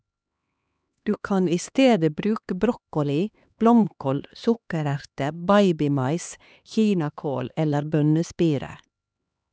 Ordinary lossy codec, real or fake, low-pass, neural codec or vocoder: none; fake; none; codec, 16 kHz, 2 kbps, X-Codec, HuBERT features, trained on LibriSpeech